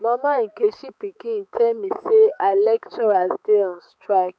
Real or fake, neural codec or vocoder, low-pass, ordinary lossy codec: fake; codec, 16 kHz, 4 kbps, X-Codec, HuBERT features, trained on balanced general audio; none; none